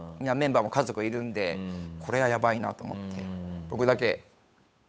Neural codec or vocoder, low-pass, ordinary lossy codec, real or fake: codec, 16 kHz, 8 kbps, FunCodec, trained on Chinese and English, 25 frames a second; none; none; fake